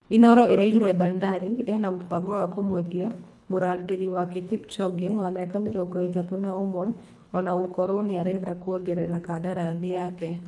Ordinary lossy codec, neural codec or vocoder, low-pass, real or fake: none; codec, 24 kHz, 1.5 kbps, HILCodec; none; fake